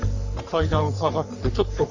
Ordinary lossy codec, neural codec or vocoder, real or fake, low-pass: none; codec, 44.1 kHz, 3.4 kbps, Pupu-Codec; fake; 7.2 kHz